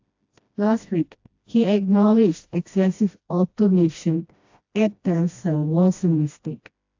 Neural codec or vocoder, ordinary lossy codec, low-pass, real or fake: codec, 16 kHz, 1 kbps, FreqCodec, smaller model; AAC, 48 kbps; 7.2 kHz; fake